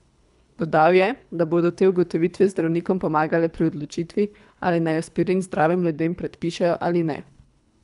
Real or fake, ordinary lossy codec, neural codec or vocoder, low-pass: fake; none; codec, 24 kHz, 3 kbps, HILCodec; 10.8 kHz